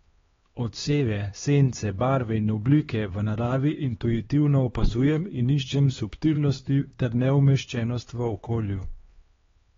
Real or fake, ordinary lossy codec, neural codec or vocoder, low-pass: fake; AAC, 24 kbps; codec, 16 kHz, 2 kbps, X-Codec, HuBERT features, trained on LibriSpeech; 7.2 kHz